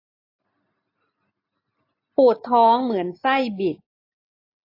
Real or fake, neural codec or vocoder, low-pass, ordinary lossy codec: real; none; 5.4 kHz; AAC, 32 kbps